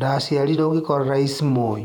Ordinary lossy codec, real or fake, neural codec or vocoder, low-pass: none; fake; vocoder, 48 kHz, 128 mel bands, Vocos; 19.8 kHz